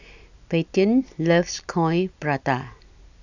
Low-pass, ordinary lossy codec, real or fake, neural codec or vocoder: 7.2 kHz; none; real; none